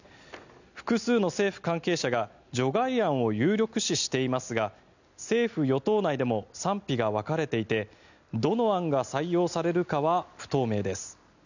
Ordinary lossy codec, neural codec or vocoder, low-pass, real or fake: none; none; 7.2 kHz; real